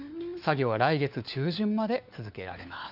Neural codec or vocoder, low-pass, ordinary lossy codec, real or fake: codec, 16 kHz, 4 kbps, FunCodec, trained on LibriTTS, 50 frames a second; 5.4 kHz; none; fake